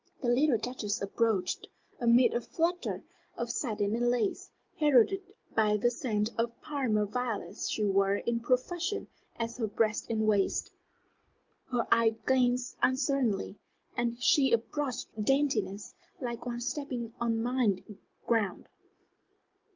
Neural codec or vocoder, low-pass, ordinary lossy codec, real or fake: none; 7.2 kHz; Opus, 32 kbps; real